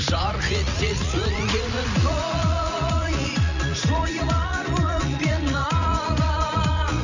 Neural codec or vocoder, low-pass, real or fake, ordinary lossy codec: vocoder, 44.1 kHz, 80 mel bands, Vocos; 7.2 kHz; fake; none